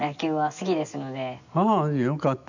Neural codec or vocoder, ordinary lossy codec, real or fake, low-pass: none; none; real; 7.2 kHz